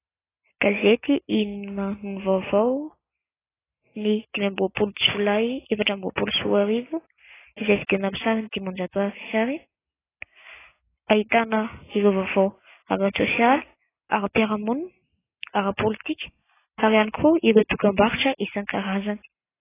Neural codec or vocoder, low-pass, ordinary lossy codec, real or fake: none; 3.6 kHz; AAC, 16 kbps; real